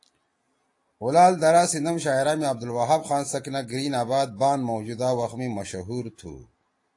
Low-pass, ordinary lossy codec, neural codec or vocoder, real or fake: 10.8 kHz; AAC, 48 kbps; none; real